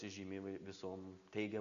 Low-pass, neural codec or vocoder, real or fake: 7.2 kHz; none; real